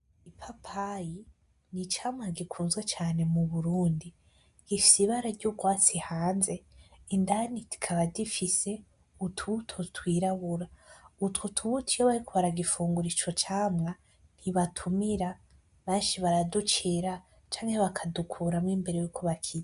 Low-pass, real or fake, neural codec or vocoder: 10.8 kHz; real; none